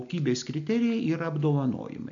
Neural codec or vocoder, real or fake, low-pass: none; real; 7.2 kHz